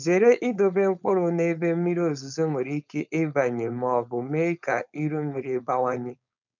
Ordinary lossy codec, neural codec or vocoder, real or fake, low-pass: none; codec, 16 kHz, 4.8 kbps, FACodec; fake; 7.2 kHz